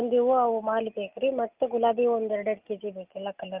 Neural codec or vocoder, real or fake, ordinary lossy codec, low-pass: none; real; Opus, 32 kbps; 3.6 kHz